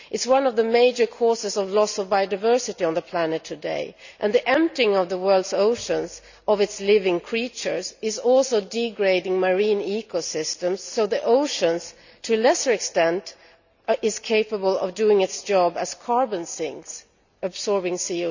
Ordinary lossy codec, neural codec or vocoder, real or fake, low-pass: none; none; real; 7.2 kHz